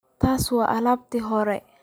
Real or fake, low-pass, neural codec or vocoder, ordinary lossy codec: real; none; none; none